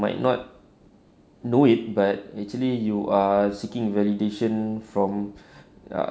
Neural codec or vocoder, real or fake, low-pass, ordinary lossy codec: none; real; none; none